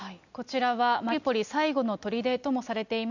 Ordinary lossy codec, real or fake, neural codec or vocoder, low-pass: none; real; none; 7.2 kHz